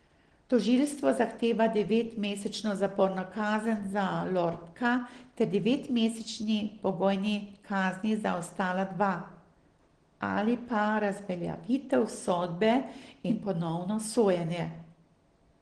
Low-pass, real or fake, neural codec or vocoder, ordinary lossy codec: 9.9 kHz; real; none; Opus, 16 kbps